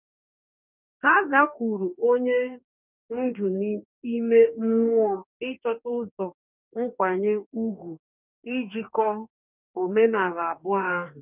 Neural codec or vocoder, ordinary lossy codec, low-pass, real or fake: codec, 44.1 kHz, 2.6 kbps, DAC; none; 3.6 kHz; fake